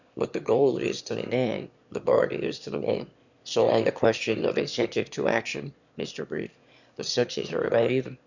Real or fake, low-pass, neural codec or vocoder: fake; 7.2 kHz; autoencoder, 22.05 kHz, a latent of 192 numbers a frame, VITS, trained on one speaker